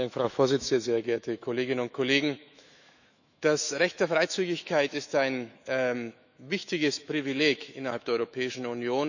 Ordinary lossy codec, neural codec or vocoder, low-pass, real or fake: none; autoencoder, 48 kHz, 128 numbers a frame, DAC-VAE, trained on Japanese speech; 7.2 kHz; fake